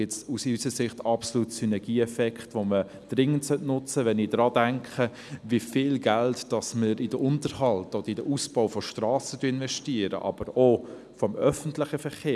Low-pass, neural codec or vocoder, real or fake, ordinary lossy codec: none; none; real; none